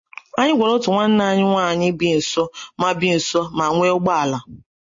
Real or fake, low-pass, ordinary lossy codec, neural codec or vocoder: real; 7.2 kHz; MP3, 32 kbps; none